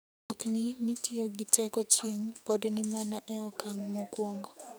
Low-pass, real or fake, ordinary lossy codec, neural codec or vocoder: none; fake; none; codec, 44.1 kHz, 2.6 kbps, SNAC